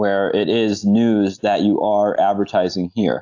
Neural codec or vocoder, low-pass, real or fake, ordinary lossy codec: none; 7.2 kHz; real; AAC, 48 kbps